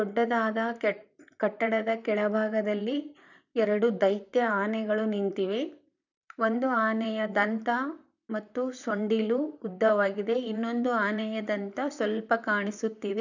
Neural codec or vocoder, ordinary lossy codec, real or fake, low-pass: vocoder, 44.1 kHz, 128 mel bands, Pupu-Vocoder; none; fake; 7.2 kHz